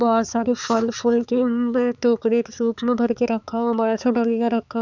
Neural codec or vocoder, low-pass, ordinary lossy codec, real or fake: codec, 16 kHz, 4 kbps, X-Codec, HuBERT features, trained on balanced general audio; 7.2 kHz; none; fake